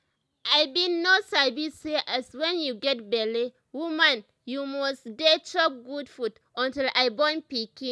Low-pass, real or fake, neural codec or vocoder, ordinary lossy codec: none; real; none; none